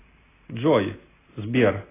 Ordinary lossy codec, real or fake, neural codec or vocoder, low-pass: AAC, 16 kbps; real; none; 3.6 kHz